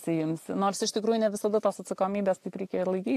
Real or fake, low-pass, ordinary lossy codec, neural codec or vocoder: real; 14.4 kHz; AAC, 64 kbps; none